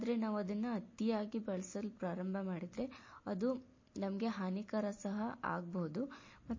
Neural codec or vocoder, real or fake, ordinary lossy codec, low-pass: none; real; MP3, 32 kbps; 7.2 kHz